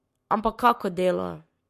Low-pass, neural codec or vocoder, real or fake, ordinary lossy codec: 14.4 kHz; codec, 44.1 kHz, 7.8 kbps, Pupu-Codec; fake; MP3, 64 kbps